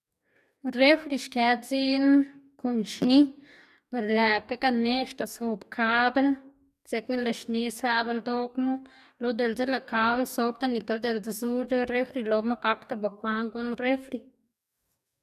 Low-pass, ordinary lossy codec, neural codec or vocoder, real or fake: 14.4 kHz; none; codec, 44.1 kHz, 2.6 kbps, DAC; fake